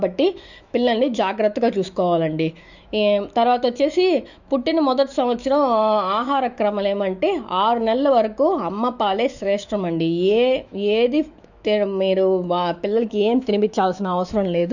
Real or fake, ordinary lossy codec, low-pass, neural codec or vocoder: fake; none; 7.2 kHz; autoencoder, 48 kHz, 128 numbers a frame, DAC-VAE, trained on Japanese speech